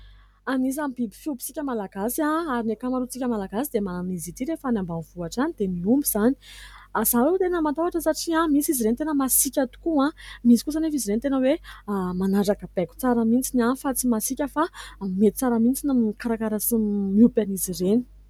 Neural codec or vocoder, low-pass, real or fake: none; 19.8 kHz; real